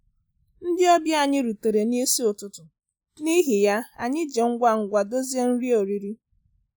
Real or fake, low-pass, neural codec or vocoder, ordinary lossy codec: real; none; none; none